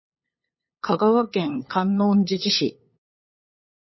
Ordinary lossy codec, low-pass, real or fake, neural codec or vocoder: MP3, 24 kbps; 7.2 kHz; fake; codec, 16 kHz, 8 kbps, FunCodec, trained on LibriTTS, 25 frames a second